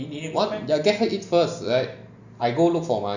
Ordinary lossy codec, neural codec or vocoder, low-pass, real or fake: Opus, 64 kbps; none; 7.2 kHz; real